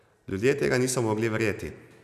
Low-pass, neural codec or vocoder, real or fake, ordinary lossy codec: 14.4 kHz; none; real; none